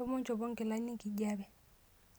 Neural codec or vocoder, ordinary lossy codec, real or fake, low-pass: none; none; real; none